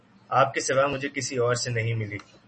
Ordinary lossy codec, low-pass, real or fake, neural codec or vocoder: MP3, 32 kbps; 10.8 kHz; real; none